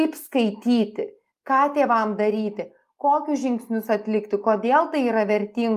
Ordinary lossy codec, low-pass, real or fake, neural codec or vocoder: Opus, 32 kbps; 14.4 kHz; real; none